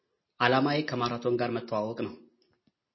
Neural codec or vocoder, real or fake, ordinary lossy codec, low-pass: none; real; MP3, 24 kbps; 7.2 kHz